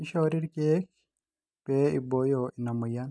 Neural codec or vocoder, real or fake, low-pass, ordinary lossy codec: none; real; none; none